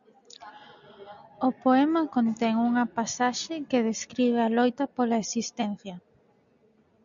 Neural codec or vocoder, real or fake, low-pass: none; real; 7.2 kHz